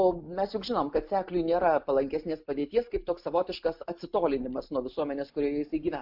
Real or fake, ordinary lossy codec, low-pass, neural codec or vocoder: real; MP3, 48 kbps; 5.4 kHz; none